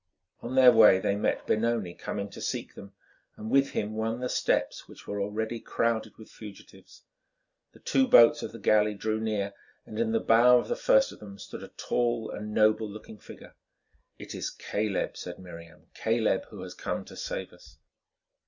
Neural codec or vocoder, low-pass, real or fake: none; 7.2 kHz; real